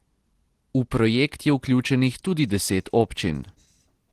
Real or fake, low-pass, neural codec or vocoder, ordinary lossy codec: real; 14.4 kHz; none; Opus, 16 kbps